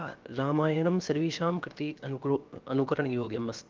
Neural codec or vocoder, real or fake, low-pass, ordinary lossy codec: codec, 16 kHz, 0.7 kbps, FocalCodec; fake; 7.2 kHz; Opus, 32 kbps